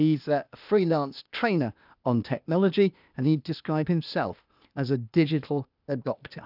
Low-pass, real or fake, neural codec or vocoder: 5.4 kHz; fake; codec, 16 kHz, 0.8 kbps, ZipCodec